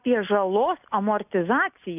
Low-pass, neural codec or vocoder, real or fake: 3.6 kHz; none; real